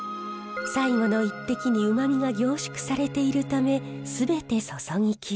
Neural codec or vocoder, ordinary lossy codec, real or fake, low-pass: none; none; real; none